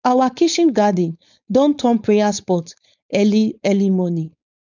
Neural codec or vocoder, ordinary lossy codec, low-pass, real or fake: codec, 16 kHz, 4.8 kbps, FACodec; none; 7.2 kHz; fake